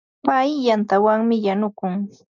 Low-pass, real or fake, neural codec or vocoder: 7.2 kHz; real; none